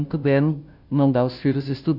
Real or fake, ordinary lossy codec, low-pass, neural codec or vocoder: fake; none; 5.4 kHz; codec, 16 kHz, 0.5 kbps, FunCodec, trained on Chinese and English, 25 frames a second